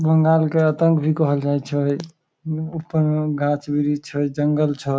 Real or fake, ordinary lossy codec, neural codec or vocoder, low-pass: real; none; none; none